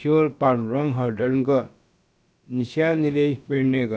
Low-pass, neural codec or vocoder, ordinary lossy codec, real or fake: none; codec, 16 kHz, about 1 kbps, DyCAST, with the encoder's durations; none; fake